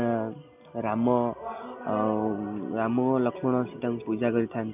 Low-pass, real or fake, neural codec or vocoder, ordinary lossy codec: 3.6 kHz; real; none; none